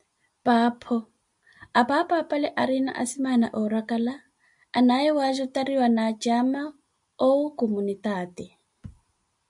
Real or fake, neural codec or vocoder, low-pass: real; none; 10.8 kHz